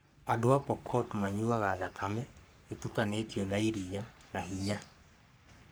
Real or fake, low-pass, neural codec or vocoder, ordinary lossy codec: fake; none; codec, 44.1 kHz, 3.4 kbps, Pupu-Codec; none